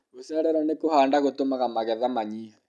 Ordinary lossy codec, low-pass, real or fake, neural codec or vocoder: none; none; real; none